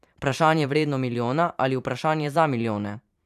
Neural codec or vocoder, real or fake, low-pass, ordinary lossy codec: vocoder, 44.1 kHz, 128 mel bands every 512 samples, BigVGAN v2; fake; 14.4 kHz; none